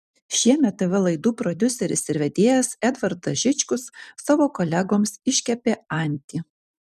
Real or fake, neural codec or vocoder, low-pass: fake; vocoder, 44.1 kHz, 128 mel bands every 256 samples, BigVGAN v2; 14.4 kHz